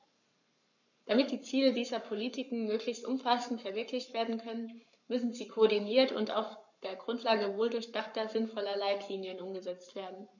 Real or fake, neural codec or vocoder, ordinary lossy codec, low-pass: fake; codec, 44.1 kHz, 7.8 kbps, Pupu-Codec; none; 7.2 kHz